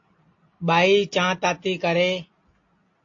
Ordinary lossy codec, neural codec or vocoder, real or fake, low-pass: AAC, 48 kbps; none; real; 7.2 kHz